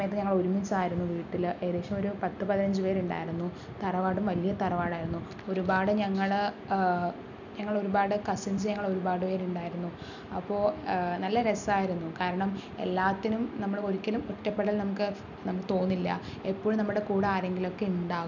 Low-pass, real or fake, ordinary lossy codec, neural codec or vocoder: 7.2 kHz; real; none; none